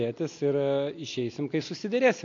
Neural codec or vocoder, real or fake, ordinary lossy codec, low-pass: none; real; AAC, 48 kbps; 7.2 kHz